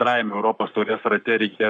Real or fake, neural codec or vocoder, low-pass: fake; codec, 44.1 kHz, 7.8 kbps, Pupu-Codec; 10.8 kHz